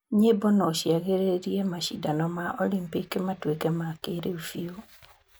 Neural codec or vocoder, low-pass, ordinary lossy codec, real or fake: none; none; none; real